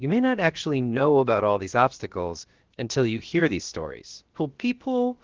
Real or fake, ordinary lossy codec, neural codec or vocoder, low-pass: fake; Opus, 16 kbps; codec, 16 kHz, about 1 kbps, DyCAST, with the encoder's durations; 7.2 kHz